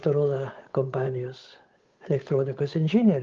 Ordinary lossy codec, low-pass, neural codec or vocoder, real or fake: Opus, 32 kbps; 7.2 kHz; codec, 16 kHz, 8 kbps, FunCodec, trained on Chinese and English, 25 frames a second; fake